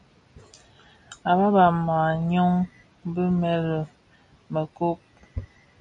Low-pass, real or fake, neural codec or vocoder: 9.9 kHz; real; none